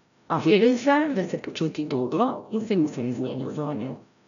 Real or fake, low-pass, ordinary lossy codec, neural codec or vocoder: fake; 7.2 kHz; none; codec, 16 kHz, 0.5 kbps, FreqCodec, larger model